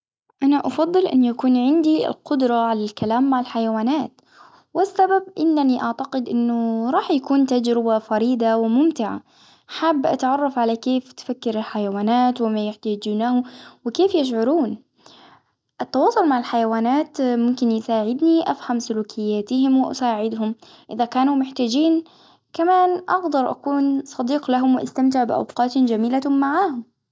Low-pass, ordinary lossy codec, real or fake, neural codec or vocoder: none; none; real; none